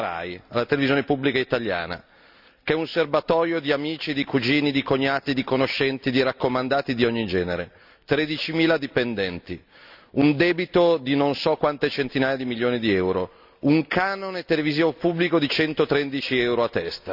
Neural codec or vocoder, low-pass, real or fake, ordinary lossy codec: none; 5.4 kHz; real; none